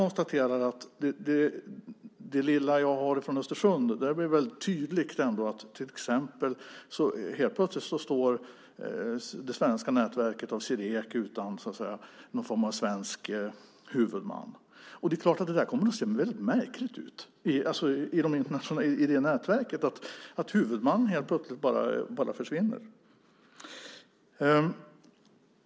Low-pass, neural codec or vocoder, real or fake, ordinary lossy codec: none; none; real; none